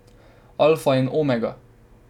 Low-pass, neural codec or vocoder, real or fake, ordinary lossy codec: 19.8 kHz; none; real; none